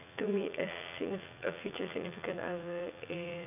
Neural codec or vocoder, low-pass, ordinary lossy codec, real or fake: vocoder, 44.1 kHz, 80 mel bands, Vocos; 3.6 kHz; none; fake